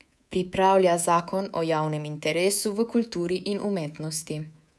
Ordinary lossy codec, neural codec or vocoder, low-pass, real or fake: none; codec, 24 kHz, 3.1 kbps, DualCodec; none; fake